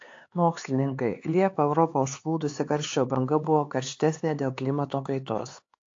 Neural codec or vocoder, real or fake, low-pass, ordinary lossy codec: codec, 16 kHz, 4 kbps, X-Codec, HuBERT features, trained on LibriSpeech; fake; 7.2 kHz; AAC, 48 kbps